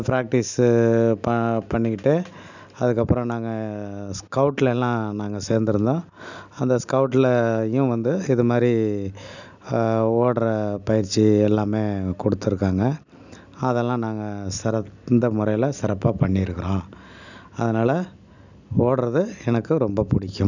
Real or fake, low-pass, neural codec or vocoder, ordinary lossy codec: real; 7.2 kHz; none; none